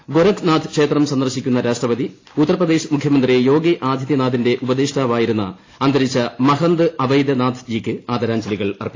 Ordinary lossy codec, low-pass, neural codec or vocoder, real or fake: AAC, 32 kbps; 7.2 kHz; none; real